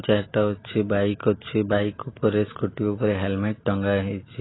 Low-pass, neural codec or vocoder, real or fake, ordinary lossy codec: 7.2 kHz; none; real; AAC, 16 kbps